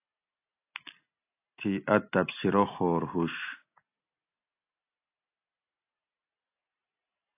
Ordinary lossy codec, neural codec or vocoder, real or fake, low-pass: AAC, 24 kbps; none; real; 3.6 kHz